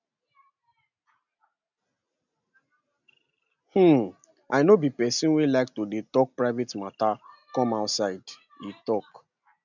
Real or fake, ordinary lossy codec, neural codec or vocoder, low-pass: real; none; none; 7.2 kHz